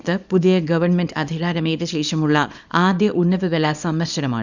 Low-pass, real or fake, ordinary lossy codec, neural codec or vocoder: 7.2 kHz; fake; none; codec, 24 kHz, 0.9 kbps, WavTokenizer, small release